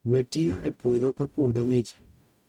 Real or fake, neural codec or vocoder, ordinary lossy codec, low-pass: fake; codec, 44.1 kHz, 0.9 kbps, DAC; MP3, 96 kbps; 19.8 kHz